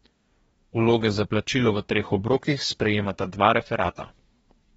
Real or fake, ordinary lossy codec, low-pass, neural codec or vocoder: fake; AAC, 24 kbps; 19.8 kHz; codec, 44.1 kHz, 2.6 kbps, DAC